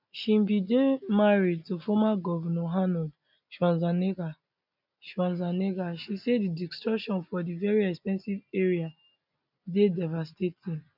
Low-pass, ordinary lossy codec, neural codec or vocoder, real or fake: 5.4 kHz; none; none; real